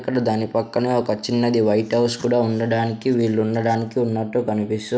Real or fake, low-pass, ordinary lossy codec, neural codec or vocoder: real; none; none; none